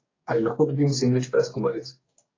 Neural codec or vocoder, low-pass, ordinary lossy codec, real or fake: codec, 44.1 kHz, 2.6 kbps, DAC; 7.2 kHz; AAC, 32 kbps; fake